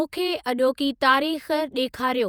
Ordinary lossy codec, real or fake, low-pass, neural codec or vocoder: none; fake; none; vocoder, 48 kHz, 128 mel bands, Vocos